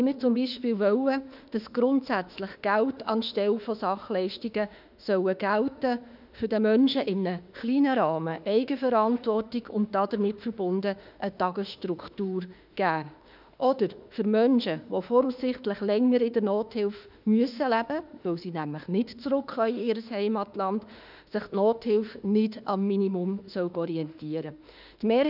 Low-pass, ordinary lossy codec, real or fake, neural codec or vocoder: 5.4 kHz; none; fake; autoencoder, 48 kHz, 32 numbers a frame, DAC-VAE, trained on Japanese speech